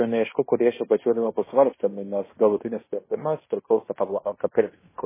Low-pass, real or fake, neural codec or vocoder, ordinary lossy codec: 3.6 kHz; fake; codec, 16 kHz in and 24 kHz out, 0.9 kbps, LongCat-Audio-Codec, fine tuned four codebook decoder; MP3, 16 kbps